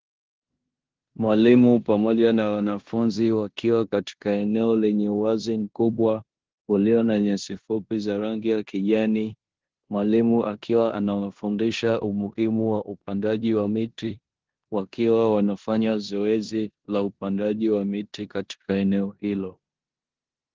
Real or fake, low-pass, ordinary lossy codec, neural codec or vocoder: fake; 7.2 kHz; Opus, 16 kbps; codec, 16 kHz in and 24 kHz out, 0.9 kbps, LongCat-Audio-Codec, four codebook decoder